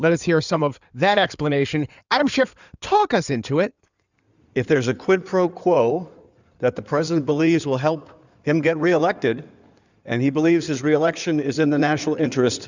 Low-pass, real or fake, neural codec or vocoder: 7.2 kHz; fake; codec, 16 kHz in and 24 kHz out, 2.2 kbps, FireRedTTS-2 codec